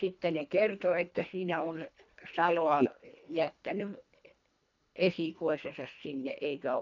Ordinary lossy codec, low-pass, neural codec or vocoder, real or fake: none; 7.2 kHz; codec, 24 kHz, 1.5 kbps, HILCodec; fake